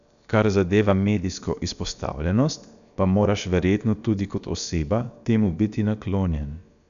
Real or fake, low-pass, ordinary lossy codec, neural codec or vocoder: fake; 7.2 kHz; none; codec, 16 kHz, about 1 kbps, DyCAST, with the encoder's durations